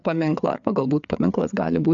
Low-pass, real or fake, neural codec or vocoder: 7.2 kHz; fake; codec, 16 kHz, 4 kbps, FreqCodec, larger model